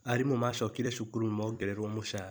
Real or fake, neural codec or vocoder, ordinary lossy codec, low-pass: fake; vocoder, 44.1 kHz, 128 mel bands every 256 samples, BigVGAN v2; none; none